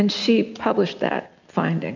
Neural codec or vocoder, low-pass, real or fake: none; 7.2 kHz; real